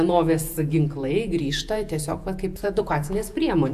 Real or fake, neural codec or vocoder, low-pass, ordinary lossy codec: fake; vocoder, 48 kHz, 128 mel bands, Vocos; 14.4 kHz; AAC, 96 kbps